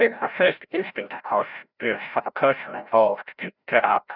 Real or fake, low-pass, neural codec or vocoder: fake; 5.4 kHz; codec, 16 kHz, 0.5 kbps, FreqCodec, larger model